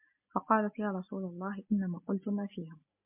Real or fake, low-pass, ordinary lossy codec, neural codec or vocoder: real; 3.6 kHz; MP3, 32 kbps; none